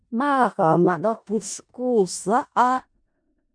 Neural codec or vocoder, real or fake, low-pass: codec, 16 kHz in and 24 kHz out, 0.4 kbps, LongCat-Audio-Codec, four codebook decoder; fake; 9.9 kHz